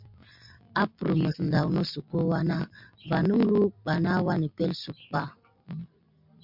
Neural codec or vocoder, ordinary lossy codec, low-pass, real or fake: none; MP3, 48 kbps; 5.4 kHz; real